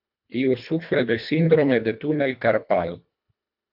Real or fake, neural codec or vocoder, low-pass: fake; codec, 24 kHz, 1.5 kbps, HILCodec; 5.4 kHz